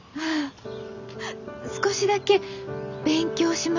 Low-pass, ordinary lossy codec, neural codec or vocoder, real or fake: 7.2 kHz; none; none; real